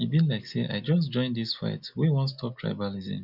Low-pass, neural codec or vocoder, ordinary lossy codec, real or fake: 5.4 kHz; none; none; real